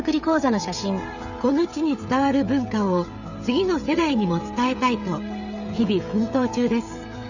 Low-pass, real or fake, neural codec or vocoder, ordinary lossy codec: 7.2 kHz; fake; codec, 16 kHz, 16 kbps, FreqCodec, smaller model; none